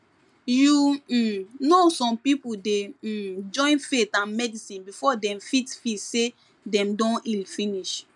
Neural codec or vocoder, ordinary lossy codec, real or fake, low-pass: none; MP3, 96 kbps; real; 10.8 kHz